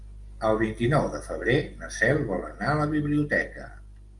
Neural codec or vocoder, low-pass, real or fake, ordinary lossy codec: none; 10.8 kHz; real; Opus, 32 kbps